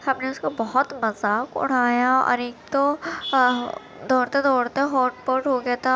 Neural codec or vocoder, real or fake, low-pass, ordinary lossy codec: none; real; none; none